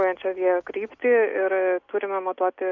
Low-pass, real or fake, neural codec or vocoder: 7.2 kHz; real; none